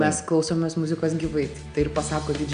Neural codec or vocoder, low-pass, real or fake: none; 9.9 kHz; real